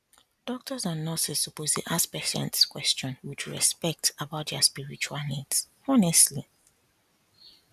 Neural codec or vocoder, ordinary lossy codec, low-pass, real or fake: none; none; 14.4 kHz; real